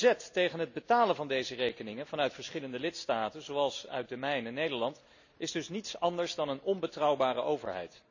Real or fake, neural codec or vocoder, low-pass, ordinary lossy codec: real; none; 7.2 kHz; none